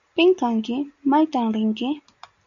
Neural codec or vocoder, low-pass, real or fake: none; 7.2 kHz; real